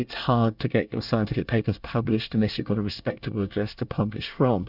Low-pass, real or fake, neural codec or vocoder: 5.4 kHz; fake; codec, 24 kHz, 1 kbps, SNAC